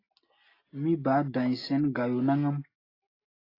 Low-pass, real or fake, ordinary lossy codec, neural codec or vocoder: 5.4 kHz; real; AAC, 24 kbps; none